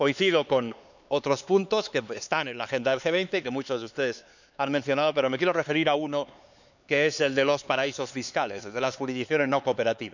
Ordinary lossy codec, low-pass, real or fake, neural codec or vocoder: none; 7.2 kHz; fake; codec, 16 kHz, 4 kbps, X-Codec, HuBERT features, trained on LibriSpeech